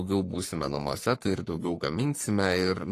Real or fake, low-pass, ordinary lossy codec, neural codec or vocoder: fake; 14.4 kHz; AAC, 48 kbps; codec, 44.1 kHz, 3.4 kbps, Pupu-Codec